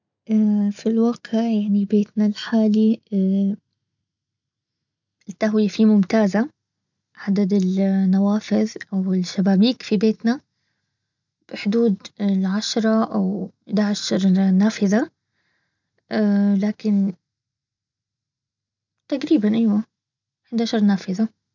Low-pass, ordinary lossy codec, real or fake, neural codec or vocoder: 7.2 kHz; none; real; none